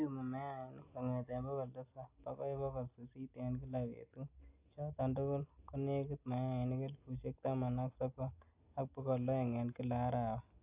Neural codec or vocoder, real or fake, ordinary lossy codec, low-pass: none; real; AAC, 32 kbps; 3.6 kHz